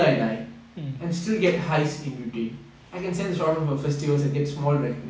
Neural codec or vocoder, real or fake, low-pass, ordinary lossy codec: none; real; none; none